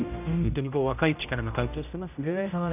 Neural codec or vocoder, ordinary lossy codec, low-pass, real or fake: codec, 16 kHz, 0.5 kbps, X-Codec, HuBERT features, trained on general audio; none; 3.6 kHz; fake